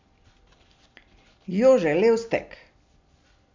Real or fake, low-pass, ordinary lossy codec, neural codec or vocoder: real; 7.2 kHz; MP3, 64 kbps; none